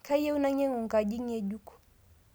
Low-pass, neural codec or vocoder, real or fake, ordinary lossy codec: none; none; real; none